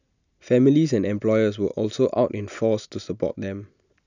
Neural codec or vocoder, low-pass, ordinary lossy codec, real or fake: none; 7.2 kHz; none; real